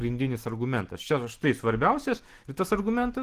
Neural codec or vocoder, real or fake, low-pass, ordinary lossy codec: none; real; 14.4 kHz; Opus, 16 kbps